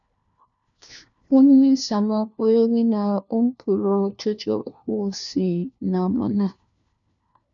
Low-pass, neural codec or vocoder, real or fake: 7.2 kHz; codec, 16 kHz, 1 kbps, FunCodec, trained on LibriTTS, 50 frames a second; fake